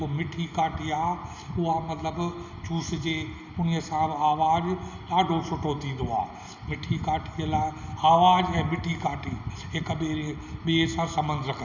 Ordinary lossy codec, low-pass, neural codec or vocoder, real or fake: none; 7.2 kHz; none; real